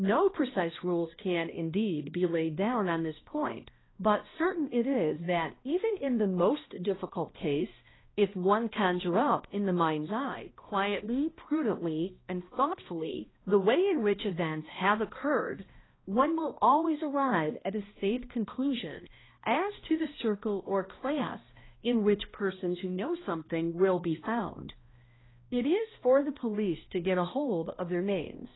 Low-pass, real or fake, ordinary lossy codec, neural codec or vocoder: 7.2 kHz; fake; AAC, 16 kbps; codec, 16 kHz, 1 kbps, X-Codec, HuBERT features, trained on balanced general audio